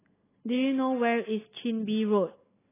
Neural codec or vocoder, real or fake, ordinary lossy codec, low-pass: none; real; AAC, 16 kbps; 3.6 kHz